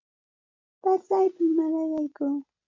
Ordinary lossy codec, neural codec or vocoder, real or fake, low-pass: MP3, 48 kbps; none; real; 7.2 kHz